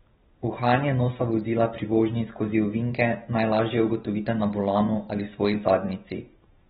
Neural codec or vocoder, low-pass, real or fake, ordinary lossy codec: none; 7.2 kHz; real; AAC, 16 kbps